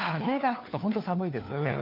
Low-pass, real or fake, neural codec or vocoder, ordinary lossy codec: 5.4 kHz; fake; codec, 16 kHz, 4 kbps, FunCodec, trained on LibriTTS, 50 frames a second; none